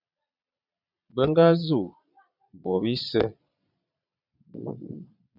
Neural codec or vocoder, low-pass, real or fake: vocoder, 22.05 kHz, 80 mel bands, Vocos; 5.4 kHz; fake